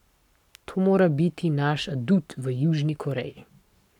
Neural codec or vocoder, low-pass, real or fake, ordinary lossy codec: codec, 44.1 kHz, 7.8 kbps, Pupu-Codec; 19.8 kHz; fake; none